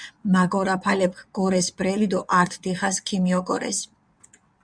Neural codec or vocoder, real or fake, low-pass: vocoder, 22.05 kHz, 80 mel bands, WaveNeXt; fake; 9.9 kHz